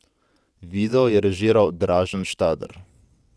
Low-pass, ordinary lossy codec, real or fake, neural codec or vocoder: none; none; fake; vocoder, 22.05 kHz, 80 mel bands, WaveNeXt